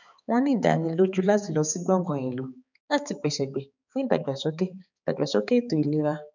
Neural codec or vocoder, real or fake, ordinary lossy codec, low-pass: codec, 16 kHz, 4 kbps, X-Codec, HuBERT features, trained on balanced general audio; fake; none; 7.2 kHz